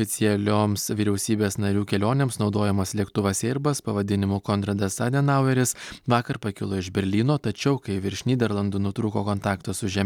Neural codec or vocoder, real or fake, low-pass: none; real; 19.8 kHz